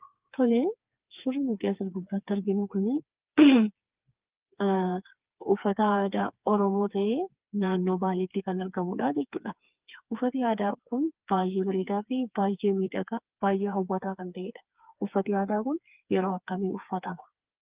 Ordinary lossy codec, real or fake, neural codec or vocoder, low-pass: Opus, 24 kbps; fake; codec, 16 kHz, 4 kbps, FreqCodec, smaller model; 3.6 kHz